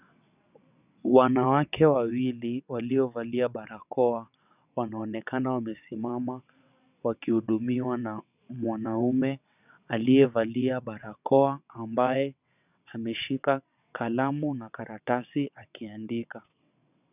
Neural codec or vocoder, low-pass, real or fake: vocoder, 22.05 kHz, 80 mel bands, WaveNeXt; 3.6 kHz; fake